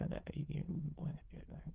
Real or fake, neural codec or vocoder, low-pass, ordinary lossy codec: fake; autoencoder, 22.05 kHz, a latent of 192 numbers a frame, VITS, trained on many speakers; 3.6 kHz; Opus, 16 kbps